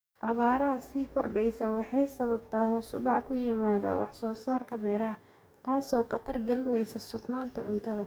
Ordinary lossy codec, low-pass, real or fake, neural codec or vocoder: none; none; fake; codec, 44.1 kHz, 2.6 kbps, DAC